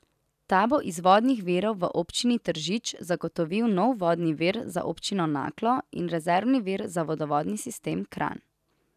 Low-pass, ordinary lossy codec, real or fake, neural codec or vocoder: 14.4 kHz; none; real; none